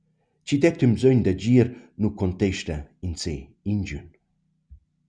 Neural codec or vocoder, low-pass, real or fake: none; 9.9 kHz; real